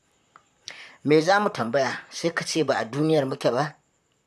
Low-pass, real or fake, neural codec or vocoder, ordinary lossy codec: 14.4 kHz; fake; vocoder, 44.1 kHz, 128 mel bands, Pupu-Vocoder; none